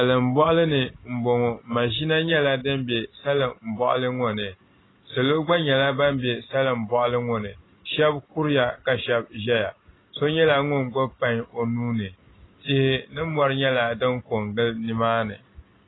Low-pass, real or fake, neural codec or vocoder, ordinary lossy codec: 7.2 kHz; real; none; AAC, 16 kbps